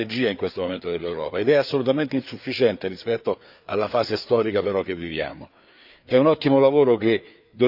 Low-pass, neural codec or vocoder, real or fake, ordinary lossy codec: 5.4 kHz; codec, 16 kHz, 4 kbps, FreqCodec, larger model; fake; none